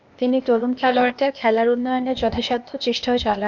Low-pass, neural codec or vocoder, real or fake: 7.2 kHz; codec, 16 kHz, 0.8 kbps, ZipCodec; fake